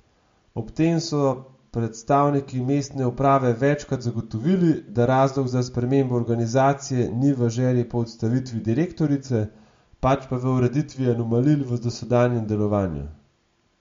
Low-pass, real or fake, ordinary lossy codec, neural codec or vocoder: 7.2 kHz; real; MP3, 48 kbps; none